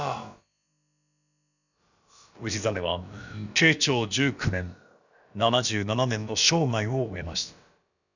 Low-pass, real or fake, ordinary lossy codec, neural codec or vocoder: 7.2 kHz; fake; none; codec, 16 kHz, about 1 kbps, DyCAST, with the encoder's durations